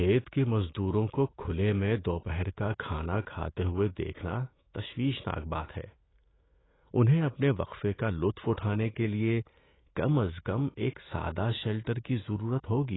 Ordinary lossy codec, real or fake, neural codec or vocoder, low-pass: AAC, 16 kbps; fake; autoencoder, 48 kHz, 128 numbers a frame, DAC-VAE, trained on Japanese speech; 7.2 kHz